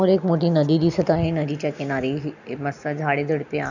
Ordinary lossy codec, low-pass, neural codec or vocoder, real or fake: none; 7.2 kHz; none; real